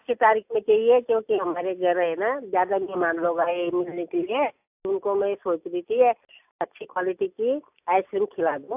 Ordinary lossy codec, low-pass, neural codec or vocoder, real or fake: AAC, 32 kbps; 3.6 kHz; none; real